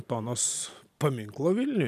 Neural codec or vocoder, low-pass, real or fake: none; 14.4 kHz; real